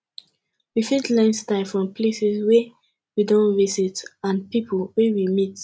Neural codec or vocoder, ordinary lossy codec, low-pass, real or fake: none; none; none; real